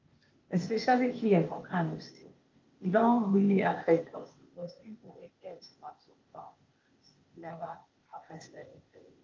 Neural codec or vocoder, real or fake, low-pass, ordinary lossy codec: codec, 16 kHz, 0.8 kbps, ZipCodec; fake; 7.2 kHz; Opus, 32 kbps